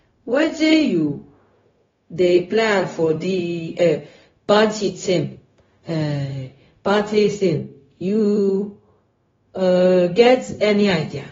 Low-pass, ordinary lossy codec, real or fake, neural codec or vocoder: 7.2 kHz; AAC, 24 kbps; fake; codec, 16 kHz, 0.4 kbps, LongCat-Audio-Codec